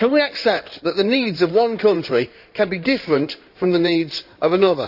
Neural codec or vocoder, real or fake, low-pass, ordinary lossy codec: codec, 16 kHz in and 24 kHz out, 2.2 kbps, FireRedTTS-2 codec; fake; 5.4 kHz; none